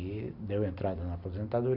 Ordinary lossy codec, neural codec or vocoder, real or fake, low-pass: none; none; real; 5.4 kHz